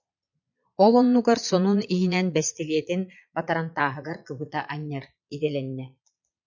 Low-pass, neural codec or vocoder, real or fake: 7.2 kHz; vocoder, 22.05 kHz, 80 mel bands, Vocos; fake